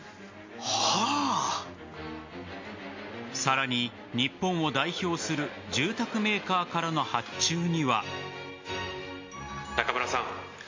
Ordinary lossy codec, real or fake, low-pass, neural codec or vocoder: MP3, 48 kbps; real; 7.2 kHz; none